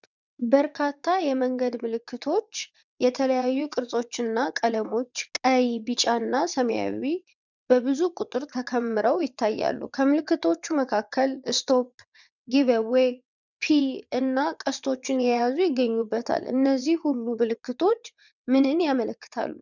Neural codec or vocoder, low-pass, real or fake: vocoder, 22.05 kHz, 80 mel bands, WaveNeXt; 7.2 kHz; fake